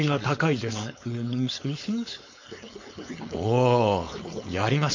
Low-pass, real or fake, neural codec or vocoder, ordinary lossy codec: 7.2 kHz; fake; codec, 16 kHz, 4.8 kbps, FACodec; MP3, 48 kbps